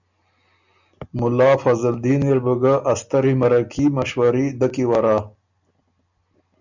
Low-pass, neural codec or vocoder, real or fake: 7.2 kHz; none; real